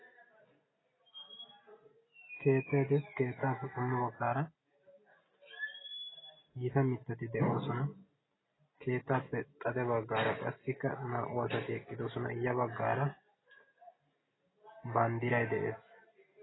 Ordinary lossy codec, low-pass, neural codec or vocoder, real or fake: AAC, 16 kbps; 7.2 kHz; none; real